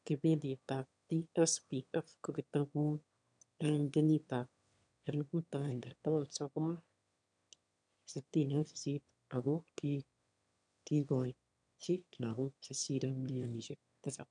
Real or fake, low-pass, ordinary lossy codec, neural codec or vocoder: fake; 9.9 kHz; none; autoencoder, 22.05 kHz, a latent of 192 numbers a frame, VITS, trained on one speaker